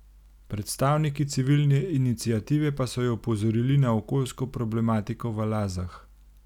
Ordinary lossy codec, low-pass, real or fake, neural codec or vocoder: none; 19.8 kHz; real; none